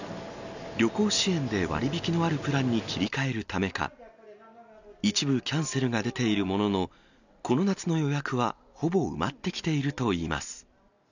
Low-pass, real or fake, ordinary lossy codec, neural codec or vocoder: 7.2 kHz; real; none; none